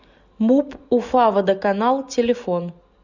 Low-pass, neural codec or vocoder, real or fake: 7.2 kHz; none; real